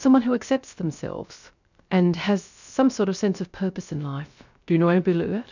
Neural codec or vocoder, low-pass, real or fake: codec, 16 kHz, 0.3 kbps, FocalCodec; 7.2 kHz; fake